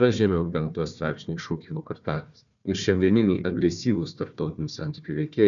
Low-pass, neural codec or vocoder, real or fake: 7.2 kHz; codec, 16 kHz, 1 kbps, FunCodec, trained on Chinese and English, 50 frames a second; fake